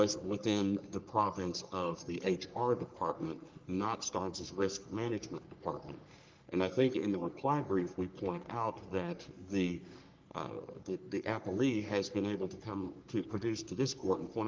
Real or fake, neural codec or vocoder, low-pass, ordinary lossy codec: fake; codec, 44.1 kHz, 3.4 kbps, Pupu-Codec; 7.2 kHz; Opus, 24 kbps